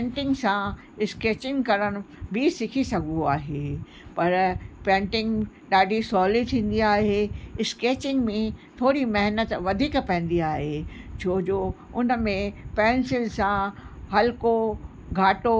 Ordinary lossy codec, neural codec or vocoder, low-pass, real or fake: none; none; none; real